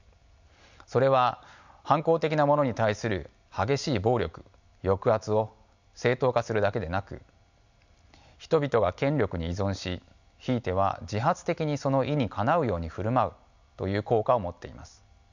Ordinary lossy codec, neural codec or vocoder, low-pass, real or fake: none; none; 7.2 kHz; real